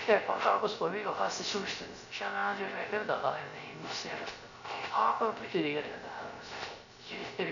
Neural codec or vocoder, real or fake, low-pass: codec, 16 kHz, 0.3 kbps, FocalCodec; fake; 7.2 kHz